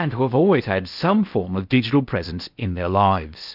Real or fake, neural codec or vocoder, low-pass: fake; codec, 16 kHz in and 24 kHz out, 0.8 kbps, FocalCodec, streaming, 65536 codes; 5.4 kHz